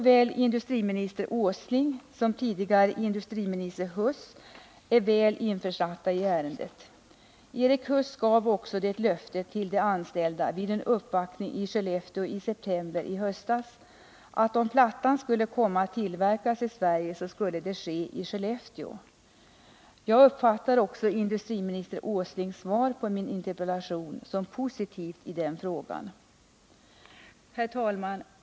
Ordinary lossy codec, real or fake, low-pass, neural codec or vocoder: none; real; none; none